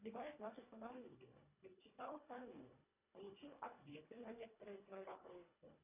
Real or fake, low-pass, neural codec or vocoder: fake; 3.6 kHz; codec, 24 kHz, 1.5 kbps, HILCodec